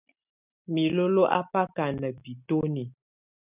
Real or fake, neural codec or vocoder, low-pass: real; none; 3.6 kHz